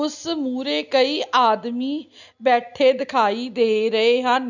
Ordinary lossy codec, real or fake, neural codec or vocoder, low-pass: none; real; none; 7.2 kHz